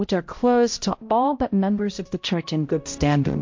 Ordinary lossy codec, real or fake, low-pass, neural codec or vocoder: MP3, 48 kbps; fake; 7.2 kHz; codec, 16 kHz, 0.5 kbps, X-Codec, HuBERT features, trained on balanced general audio